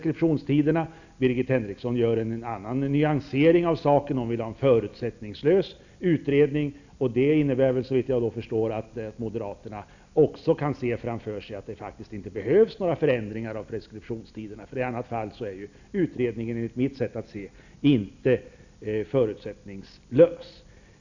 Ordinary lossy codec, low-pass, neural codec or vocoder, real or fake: none; 7.2 kHz; none; real